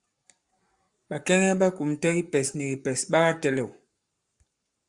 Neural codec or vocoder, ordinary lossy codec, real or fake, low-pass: codec, 44.1 kHz, 7.8 kbps, Pupu-Codec; Opus, 64 kbps; fake; 10.8 kHz